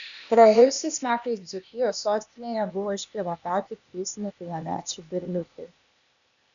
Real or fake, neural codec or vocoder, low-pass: fake; codec, 16 kHz, 0.8 kbps, ZipCodec; 7.2 kHz